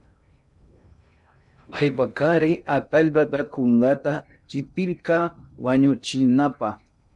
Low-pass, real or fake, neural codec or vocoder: 10.8 kHz; fake; codec, 16 kHz in and 24 kHz out, 0.6 kbps, FocalCodec, streaming, 2048 codes